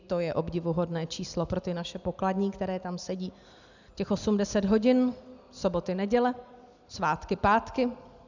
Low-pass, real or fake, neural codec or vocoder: 7.2 kHz; real; none